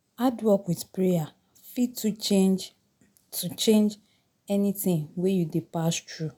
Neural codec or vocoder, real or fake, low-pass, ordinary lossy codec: none; real; 19.8 kHz; none